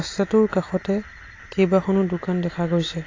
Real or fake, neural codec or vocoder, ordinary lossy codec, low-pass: real; none; AAC, 32 kbps; 7.2 kHz